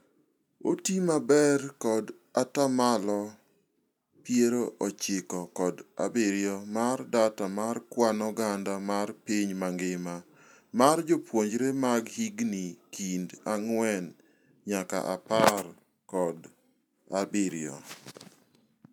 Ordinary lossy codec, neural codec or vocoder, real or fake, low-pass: none; none; real; 19.8 kHz